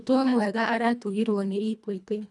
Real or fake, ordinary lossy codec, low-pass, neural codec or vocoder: fake; none; 10.8 kHz; codec, 24 kHz, 1.5 kbps, HILCodec